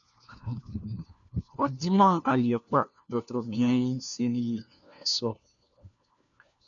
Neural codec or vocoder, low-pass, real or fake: codec, 16 kHz, 1 kbps, FunCodec, trained on LibriTTS, 50 frames a second; 7.2 kHz; fake